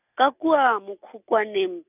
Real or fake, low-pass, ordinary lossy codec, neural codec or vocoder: real; 3.6 kHz; none; none